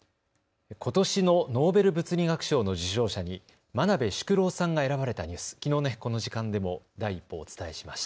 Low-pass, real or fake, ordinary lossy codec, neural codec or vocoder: none; real; none; none